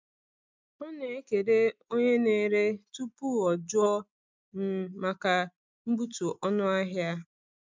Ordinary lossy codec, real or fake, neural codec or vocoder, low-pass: MP3, 64 kbps; real; none; 7.2 kHz